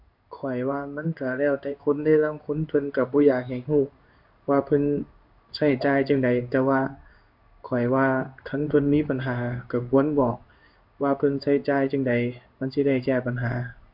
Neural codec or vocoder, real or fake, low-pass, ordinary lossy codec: codec, 16 kHz in and 24 kHz out, 1 kbps, XY-Tokenizer; fake; 5.4 kHz; MP3, 48 kbps